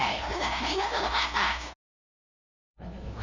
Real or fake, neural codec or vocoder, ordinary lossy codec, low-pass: fake; codec, 16 kHz, 0.5 kbps, FunCodec, trained on LibriTTS, 25 frames a second; none; 7.2 kHz